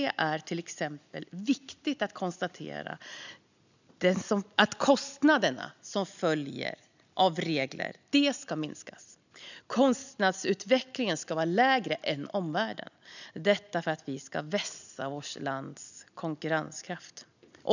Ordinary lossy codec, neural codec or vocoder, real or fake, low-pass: none; none; real; 7.2 kHz